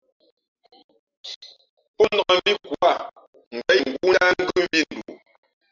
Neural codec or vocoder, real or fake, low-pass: none; real; 7.2 kHz